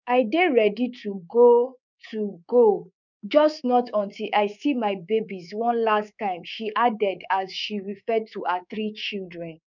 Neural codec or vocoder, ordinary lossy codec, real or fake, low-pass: codec, 24 kHz, 3.1 kbps, DualCodec; none; fake; 7.2 kHz